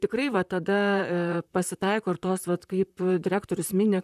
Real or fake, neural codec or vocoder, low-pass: fake; vocoder, 44.1 kHz, 128 mel bands, Pupu-Vocoder; 14.4 kHz